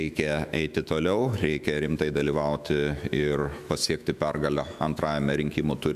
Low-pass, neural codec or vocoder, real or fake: 14.4 kHz; autoencoder, 48 kHz, 128 numbers a frame, DAC-VAE, trained on Japanese speech; fake